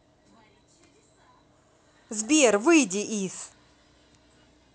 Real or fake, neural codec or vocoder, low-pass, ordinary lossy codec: real; none; none; none